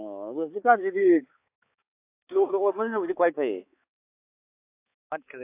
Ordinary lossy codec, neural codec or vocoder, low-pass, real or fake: AAC, 24 kbps; codec, 16 kHz, 4 kbps, X-Codec, HuBERT features, trained on balanced general audio; 3.6 kHz; fake